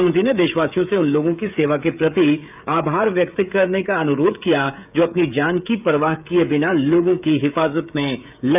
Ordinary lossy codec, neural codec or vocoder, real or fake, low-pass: none; codec, 16 kHz, 16 kbps, FreqCodec, smaller model; fake; 3.6 kHz